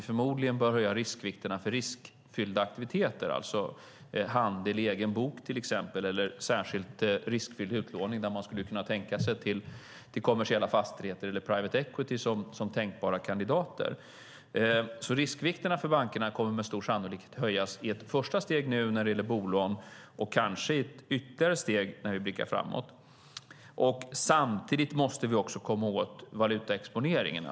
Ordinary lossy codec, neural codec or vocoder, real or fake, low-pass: none; none; real; none